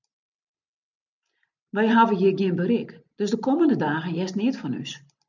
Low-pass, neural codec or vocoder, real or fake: 7.2 kHz; none; real